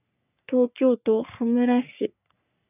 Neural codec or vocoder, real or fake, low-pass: codec, 44.1 kHz, 3.4 kbps, Pupu-Codec; fake; 3.6 kHz